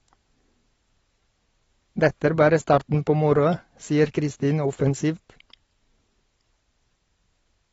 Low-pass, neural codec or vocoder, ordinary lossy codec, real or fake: 19.8 kHz; none; AAC, 24 kbps; real